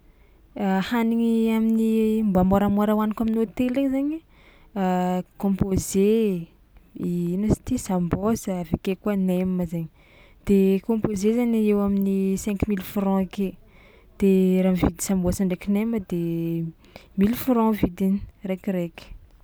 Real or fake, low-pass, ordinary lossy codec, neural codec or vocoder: real; none; none; none